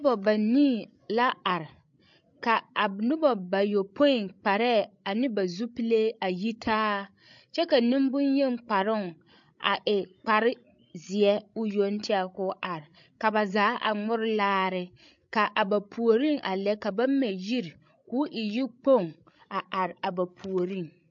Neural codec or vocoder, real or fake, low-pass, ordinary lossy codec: codec, 16 kHz, 16 kbps, FreqCodec, larger model; fake; 7.2 kHz; MP3, 48 kbps